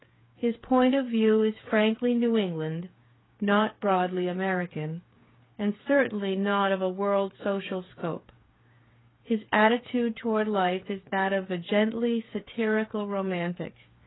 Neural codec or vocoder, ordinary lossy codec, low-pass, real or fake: codec, 16 kHz, 8 kbps, FreqCodec, smaller model; AAC, 16 kbps; 7.2 kHz; fake